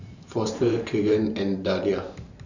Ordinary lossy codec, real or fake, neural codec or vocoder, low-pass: none; fake; vocoder, 44.1 kHz, 128 mel bands every 512 samples, BigVGAN v2; 7.2 kHz